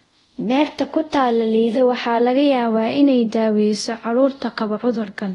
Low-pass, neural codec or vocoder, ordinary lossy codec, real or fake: 10.8 kHz; codec, 24 kHz, 0.5 kbps, DualCodec; AAC, 32 kbps; fake